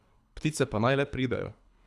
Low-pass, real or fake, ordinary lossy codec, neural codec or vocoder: none; fake; none; codec, 24 kHz, 3 kbps, HILCodec